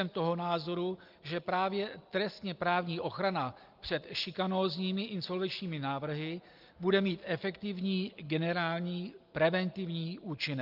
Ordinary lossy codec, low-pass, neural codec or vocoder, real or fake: Opus, 32 kbps; 5.4 kHz; none; real